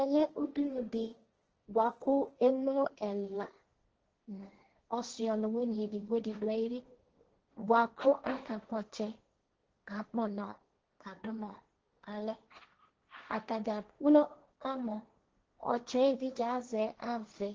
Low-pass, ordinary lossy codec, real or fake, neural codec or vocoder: 7.2 kHz; Opus, 16 kbps; fake; codec, 16 kHz, 1.1 kbps, Voila-Tokenizer